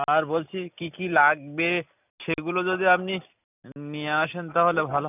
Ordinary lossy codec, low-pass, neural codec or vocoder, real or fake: none; 3.6 kHz; none; real